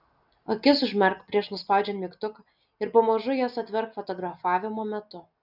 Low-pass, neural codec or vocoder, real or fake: 5.4 kHz; none; real